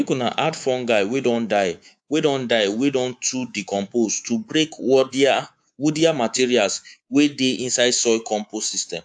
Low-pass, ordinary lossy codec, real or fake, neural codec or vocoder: 9.9 kHz; AAC, 64 kbps; fake; codec, 24 kHz, 3.1 kbps, DualCodec